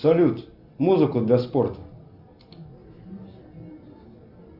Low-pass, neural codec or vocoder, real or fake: 5.4 kHz; none; real